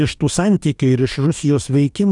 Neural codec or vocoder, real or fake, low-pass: codec, 44.1 kHz, 2.6 kbps, DAC; fake; 10.8 kHz